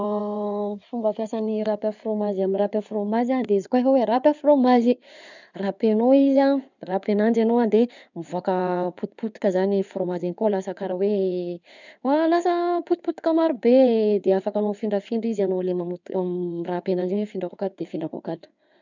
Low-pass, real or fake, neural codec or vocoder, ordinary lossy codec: 7.2 kHz; fake; codec, 16 kHz in and 24 kHz out, 2.2 kbps, FireRedTTS-2 codec; none